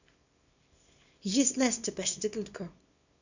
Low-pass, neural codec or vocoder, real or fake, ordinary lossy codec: 7.2 kHz; codec, 24 kHz, 0.9 kbps, WavTokenizer, small release; fake; none